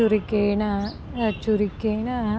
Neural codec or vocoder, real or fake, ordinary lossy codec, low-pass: none; real; none; none